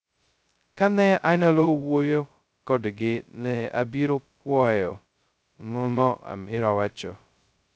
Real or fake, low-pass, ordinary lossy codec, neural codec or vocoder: fake; none; none; codec, 16 kHz, 0.2 kbps, FocalCodec